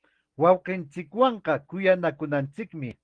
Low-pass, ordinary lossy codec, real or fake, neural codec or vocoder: 9.9 kHz; Opus, 16 kbps; real; none